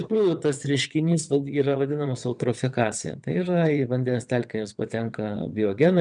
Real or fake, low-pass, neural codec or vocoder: fake; 9.9 kHz; vocoder, 22.05 kHz, 80 mel bands, WaveNeXt